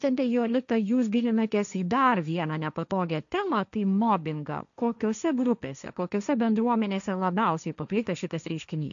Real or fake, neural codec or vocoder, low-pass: fake; codec, 16 kHz, 1.1 kbps, Voila-Tokenizer; 7.2 kHz